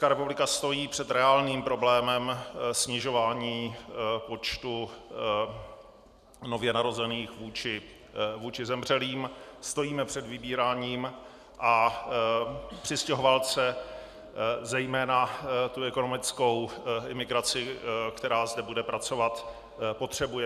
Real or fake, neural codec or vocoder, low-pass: real; none; 14.4 kHz